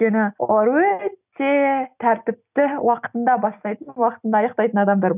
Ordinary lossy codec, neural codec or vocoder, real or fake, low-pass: none; none; real; 3.6 kHz